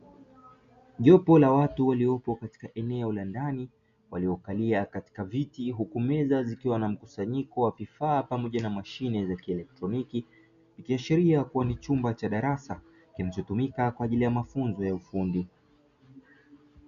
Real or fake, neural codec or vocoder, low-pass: real; none; 7.2 kHz